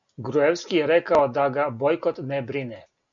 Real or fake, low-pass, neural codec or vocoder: real; 7.2 kHz; none